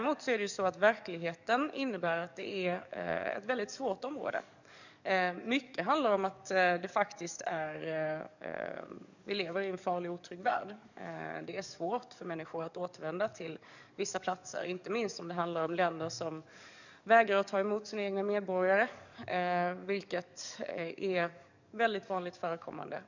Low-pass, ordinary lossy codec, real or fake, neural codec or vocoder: 7.2 kHz; none; fake; codec, 44.1 kHz, 7.8 kbps, DAC